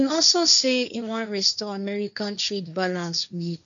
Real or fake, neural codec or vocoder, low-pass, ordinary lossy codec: fake; codec, 16 kHz, 1.1 kbps, Voila-Tokenizer; 7.2 kHz; none